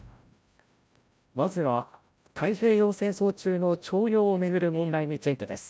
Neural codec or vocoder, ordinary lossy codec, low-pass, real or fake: codec, 16 kHz, 0.5 kbps, FreqCodec, larger model; none; none; fake